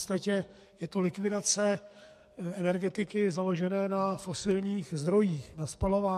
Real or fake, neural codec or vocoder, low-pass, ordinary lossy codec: fake; codec, 44.1 kHz, 2.6 kbps, SNAC; 14.4 kHz; MP3, 64 kbps